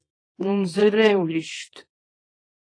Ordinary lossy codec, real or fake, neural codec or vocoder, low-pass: AAC, 32 kbps; fake; codec, 24 kHz, 0.9 kbps, WavTokenizer, small release; 9.9 kHz